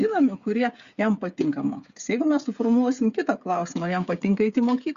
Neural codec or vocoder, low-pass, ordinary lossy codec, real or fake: codec, 16 kHz, 8 kbps, FreqCodec, smaller model; 7.2 kHz; Opus, 64 kbps; fake